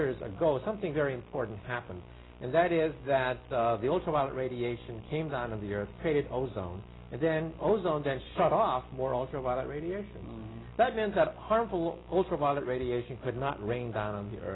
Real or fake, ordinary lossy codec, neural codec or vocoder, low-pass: real; AAC, 16 kbps; none; 7.2 kHz